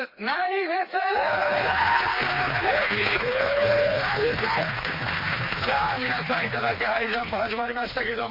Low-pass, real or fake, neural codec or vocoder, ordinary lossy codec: 5.4 kHz; fake; codec, 16 kHz, 2 kbps, FreqCodec, smaller model; MP3, 24 kbps